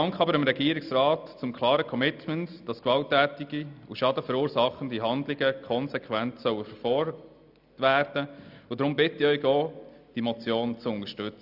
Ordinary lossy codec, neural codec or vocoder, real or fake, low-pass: none; none; real; 5.4 kHz